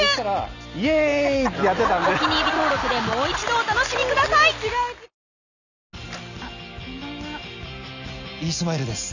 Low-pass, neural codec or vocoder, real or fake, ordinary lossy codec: 7.2 kHz; none; real; none